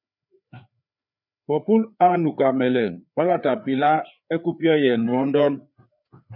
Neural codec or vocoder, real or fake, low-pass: codec, 16 kHz, 4 kbps, FreqCodec, larger model; fake; 5.4 kHz